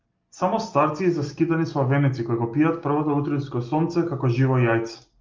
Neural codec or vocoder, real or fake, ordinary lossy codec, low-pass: none; real; Opus, 32 kbps; 7.2 kHz